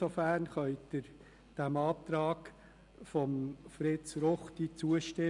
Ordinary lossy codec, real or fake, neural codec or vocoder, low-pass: none; real; none; 10.8 kHz